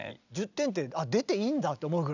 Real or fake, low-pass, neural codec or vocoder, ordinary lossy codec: real; 7.2 kHz; none; none